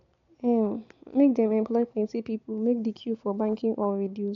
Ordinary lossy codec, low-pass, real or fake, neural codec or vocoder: AAC, 48 kbps; 7.2 kHz; real; none